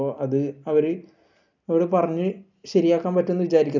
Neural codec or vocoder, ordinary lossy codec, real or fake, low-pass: none; none; real; 7.2 kHz